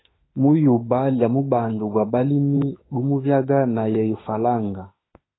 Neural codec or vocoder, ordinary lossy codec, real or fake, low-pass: autoencoder, 48 kHz, 32 numbers a frame, DAC-VAE, trained on Japanese speech; AAC, 16 kbps; fake; 7.2 kHz